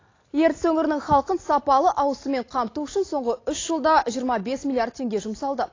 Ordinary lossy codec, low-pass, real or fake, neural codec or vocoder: AAC, 32 kbps; 7.2 kHz; real; none